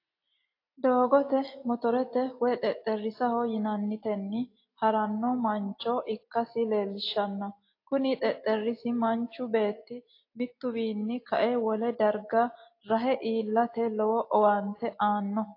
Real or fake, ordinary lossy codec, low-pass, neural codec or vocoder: real; AAC, 32 kbps; 5.4 kHz; none